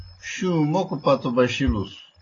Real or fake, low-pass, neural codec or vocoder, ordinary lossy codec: real; 7.2 kHz; none; AAC, 32 kbps